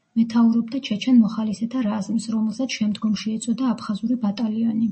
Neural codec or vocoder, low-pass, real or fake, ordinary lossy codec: none; 9.9 kHz; real; MP3, 32 kbps